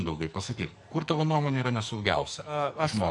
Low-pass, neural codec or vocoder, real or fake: 10.8 kHz; codec, 44.1 kHz, 2.6 kbps, SNAC; fake